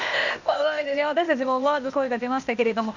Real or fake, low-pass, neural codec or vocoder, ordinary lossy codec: fake; 7.2 kHz; codec, 16 kHz, 0.8 kbps, ZipCodec; none